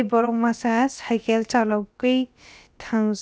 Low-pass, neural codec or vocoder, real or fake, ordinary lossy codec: none; codec, 16 kHz, about 1 kbps, DyCAST, with the encoder's durations; fake; none